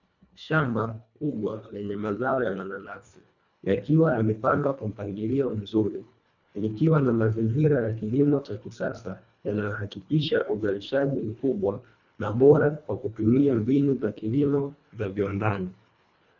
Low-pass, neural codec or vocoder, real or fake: 7.2 kHz; codec, 24 kHz, 1.5 kbps, HILCodec; fake